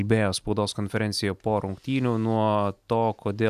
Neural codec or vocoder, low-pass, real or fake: none; 14.4 kHz; real